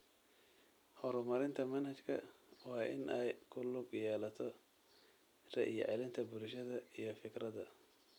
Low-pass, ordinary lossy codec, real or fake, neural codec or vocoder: none; none; fake; vocoder, 44.1 kHz, 128 mel bands every 256 samples, BigVGAN v2